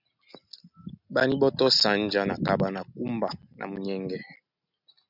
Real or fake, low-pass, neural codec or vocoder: real; 5.4 kHz; none